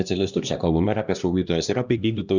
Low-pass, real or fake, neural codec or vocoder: 7.2 kHz; fake; codec, 16 kHz, 1 kbps, X-Codec, HuBERT features, trained on LibriSpeech